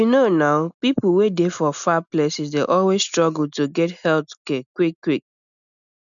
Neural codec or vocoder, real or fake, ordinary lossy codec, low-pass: none; real; none; 7.2 kHz